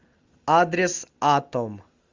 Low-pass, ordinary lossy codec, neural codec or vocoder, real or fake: 7.2 kHz; Opus, 32 kbps; none; real